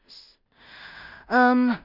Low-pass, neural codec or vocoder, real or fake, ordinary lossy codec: 5.4 kHz; codec, 16 kHz in and 24 kHz out, 0.4 kbps, LongCat-Audio-Codec, two codebook decoder; fake; none